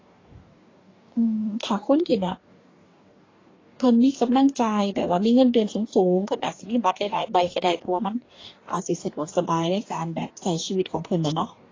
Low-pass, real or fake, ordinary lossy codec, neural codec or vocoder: 7.2 kHz; fake; AAC, 32 kbps; codec, 44.1 kHz, 2.6 kbps, DAC